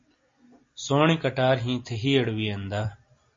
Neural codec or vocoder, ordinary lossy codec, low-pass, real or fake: none; MP3, 32 kbps; 7.2 kHz; real